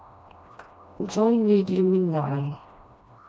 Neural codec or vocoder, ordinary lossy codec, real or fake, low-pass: codec, 16 kHz, 1 kbps, FreqCodec, smaller model; none; fake; none